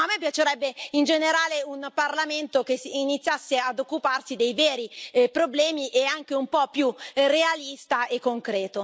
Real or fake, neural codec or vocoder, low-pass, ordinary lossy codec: real; none; none; none